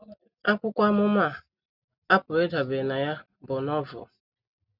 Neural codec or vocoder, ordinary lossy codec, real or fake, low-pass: none; none; real; 5.4 kHz